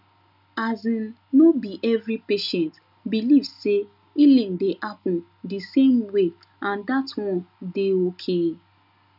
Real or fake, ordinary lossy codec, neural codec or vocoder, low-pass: real; none; none; 5.4 kHz